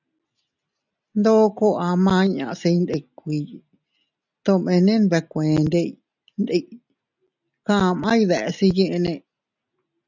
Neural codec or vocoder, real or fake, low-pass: none; real; 7.2 kHz